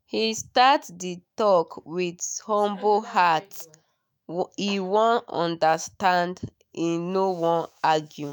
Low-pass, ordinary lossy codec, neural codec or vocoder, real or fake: none; none; autoencoder, 48 kHz, 128 numbers a frame, DAC-VAE, trained on Japanese speech; fake